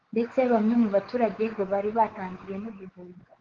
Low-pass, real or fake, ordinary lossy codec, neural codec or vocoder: 7.2 kHz; fake; Opus, 16 kbps; codec, 16 kHz, 4 kbps, X-Codec, WavLM features, trained on Multilingual LibriSpeech